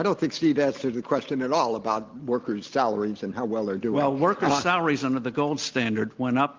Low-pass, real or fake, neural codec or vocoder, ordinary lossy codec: 7.2 kHz; real; none; Opus, 24 kbps